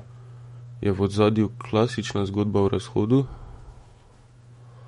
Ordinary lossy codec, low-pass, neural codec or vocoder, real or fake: MP3, 48 kbps; 19.8 kHz; autoencoder, 48 kHz, 128 numbers a frame, DAC-VAE, trained on Japanese speech; fake